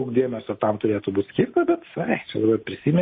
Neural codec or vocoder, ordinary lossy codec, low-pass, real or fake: none; MP3, 24 kbps; 7.2 kHz; real